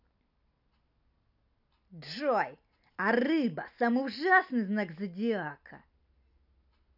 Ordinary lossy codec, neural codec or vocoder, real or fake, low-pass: none; none; real; 5.4 kHz